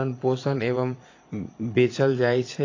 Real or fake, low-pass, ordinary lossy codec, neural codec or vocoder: fake; 7.2 kHz; AAC, 32 kbps; vocoder, 22.05 kHz, 80 mel bands, Vocos